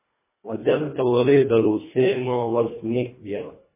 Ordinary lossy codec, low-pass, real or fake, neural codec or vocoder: MP3, 16 kbps; 3.6 kHz; fake; codec, 24 kHz, 1.5 kbps, HILCodec